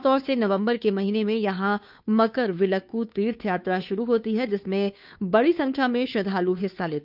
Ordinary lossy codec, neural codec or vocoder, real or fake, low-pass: none; codec, 16 kHz, 2 kbps, FunCodec, trained on Chinese and English, 25 frames a second; fake; 5.4 kHz